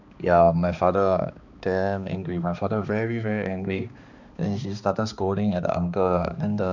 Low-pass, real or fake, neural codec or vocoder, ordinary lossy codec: 7.2 kHz; fake; codec, 16 kHz, 2 kbps, X-Codec, HuBERT features, trained on balanced general audio; none